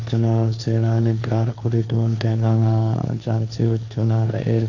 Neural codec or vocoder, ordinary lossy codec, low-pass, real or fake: codec, 16 kHz, 1.1 kbps, Voila-Tokenizer; none; 7.2 kHz; fake